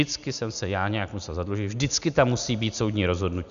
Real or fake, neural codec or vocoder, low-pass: real; none; 7.2 kHz